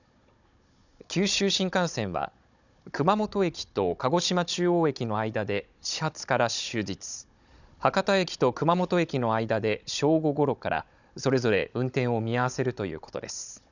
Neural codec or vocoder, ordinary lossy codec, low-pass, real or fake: codec, 16 kHz, 16 kbps, FunCodec, trained on Chinese and English, 50 frames a second; none; 7.2 kHz; fake